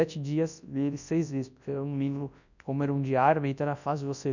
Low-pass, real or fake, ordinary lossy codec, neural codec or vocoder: 7.2 kHz; fake; none; codec, 24 kHz, 0.9 kbps, WavTokenizer, large speech release